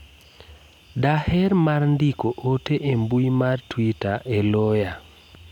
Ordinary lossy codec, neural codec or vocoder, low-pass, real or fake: none; none; 19.8 kHz; real